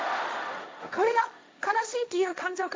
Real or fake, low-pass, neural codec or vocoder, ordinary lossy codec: fake; none; codec, 16 kHz, 1.1 kbps, Voila-Tokenizer; none